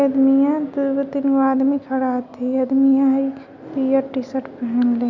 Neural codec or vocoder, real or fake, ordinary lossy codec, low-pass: none; real; none; 7.2 kHz